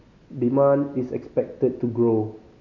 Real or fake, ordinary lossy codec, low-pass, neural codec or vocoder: real; none; 7.2 kHz; none